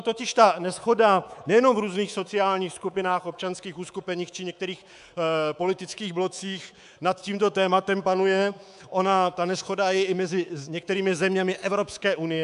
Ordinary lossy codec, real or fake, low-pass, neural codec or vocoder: AAC, 96 kbps; fake; 10.8 kHz; codec, 24 kHz, 3.1 kbps, DualCodec